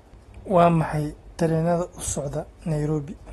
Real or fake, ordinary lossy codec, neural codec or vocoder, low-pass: real; AAC, 32 kbps; none; 19.8 kHz